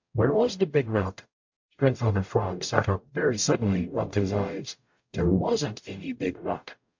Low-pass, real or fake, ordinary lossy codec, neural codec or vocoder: 7.2 kHz; fake; MP3, 48 kbps; codec, 44.1 kHz, 0.9 kbps, DAC